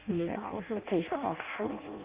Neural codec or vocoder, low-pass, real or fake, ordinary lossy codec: codec, 16 kHz in and 24 kHz out, 0.6 kbps, FireRedTTS-2 codec; 3.6 kHz; fake; Opus, 24 kbps